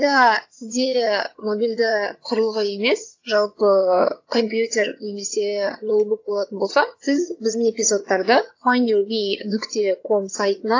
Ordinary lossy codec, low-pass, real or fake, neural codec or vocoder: AAC, 48 kbps; 7.2 kHz; fake; vocoder, 22.05 kHz, 80 mel bands, HiFi-GAN